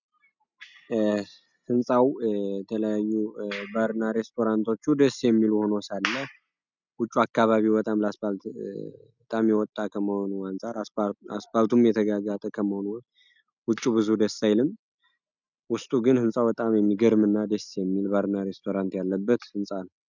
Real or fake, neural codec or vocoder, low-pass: real; none; 7.2 kHz